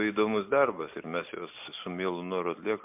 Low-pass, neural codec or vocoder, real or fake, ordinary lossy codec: 3.6 kHz; none; real; MP3, 32 kbps